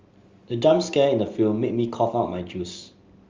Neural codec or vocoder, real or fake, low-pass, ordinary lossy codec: none; real; 7.2 kHz; Opus, 32 kbps